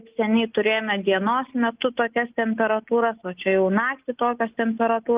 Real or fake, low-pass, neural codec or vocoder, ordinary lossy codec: real; 3.6 kHz; none; Opus, 32 kbps